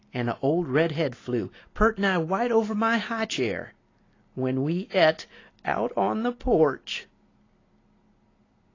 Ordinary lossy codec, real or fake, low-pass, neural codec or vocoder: AAC, 32 kbps; real; 7.2 kHz; none